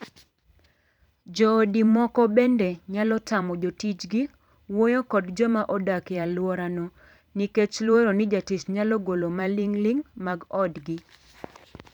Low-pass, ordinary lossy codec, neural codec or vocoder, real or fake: 19.8 kHz; none; vocoder, 44.1 kHz, 128 mel bands every 512 samples, BigVGAN v2; fake